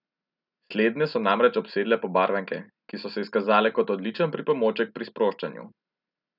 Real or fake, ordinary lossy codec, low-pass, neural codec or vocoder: real; none; 5.4 kHz; none